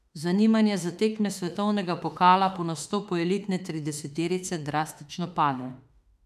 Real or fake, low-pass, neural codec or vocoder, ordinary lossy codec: fake; 14.4 kHz; autoencoder, 48 kHz, 32 numbers a frame, DAC-VAE, trained on Japanese speech; none